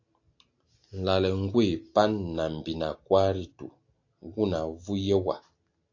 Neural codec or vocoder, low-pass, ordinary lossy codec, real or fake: none; 7.2 kHz; AAC, 48 kbps; real